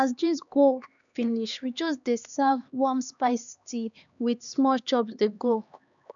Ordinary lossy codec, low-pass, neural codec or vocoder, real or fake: none; 7.2 kHz; codec, 16 kHz, 2 kbps, X-Codec, HuBERT features, trained on LibriSpeech; fake